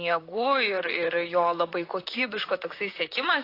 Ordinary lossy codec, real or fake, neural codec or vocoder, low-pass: AAC, 32 kbps; fake; vocoder, 44.1 kHz, 128 mel bands, Pupu-Vocoder; 5.4 kHz